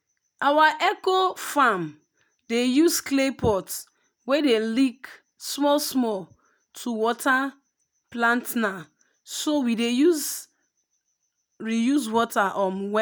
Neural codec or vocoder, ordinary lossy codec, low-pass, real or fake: none; none; none; real